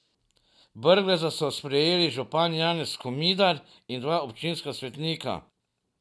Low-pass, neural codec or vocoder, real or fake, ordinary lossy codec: none; none; real; none